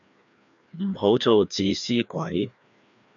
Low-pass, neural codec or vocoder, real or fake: 7.2 kHz; codec, 16 kHz, 2 kbps, FreqCodec, larger model; fake